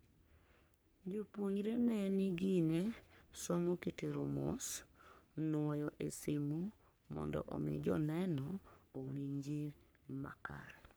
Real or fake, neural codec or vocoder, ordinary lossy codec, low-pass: fake; codec, 44.1 kHz, 3.4 kbps, Pupu-Codec; none; none